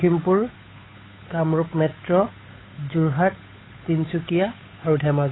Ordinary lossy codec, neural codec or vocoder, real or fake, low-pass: AAC, 16 kbps; codec, 16 kHz, 8 kbps, FunCodec, trained on Chinese and English, 25 frames a second; fake; 7.2 kHz